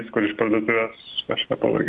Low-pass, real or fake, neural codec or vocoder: 10.8 kHz; real; none